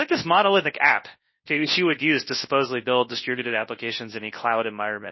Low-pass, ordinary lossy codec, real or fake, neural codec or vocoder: 7.2 kHz; MP3, 24 kbps; fake; codec, 24 kHz, 0.9 kbps, WavTokenizer, large speech release